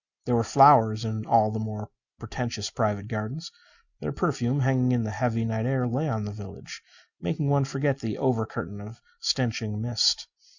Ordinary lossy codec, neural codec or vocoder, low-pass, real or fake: Opus, 64 kbps; none; 7.2 kHz; real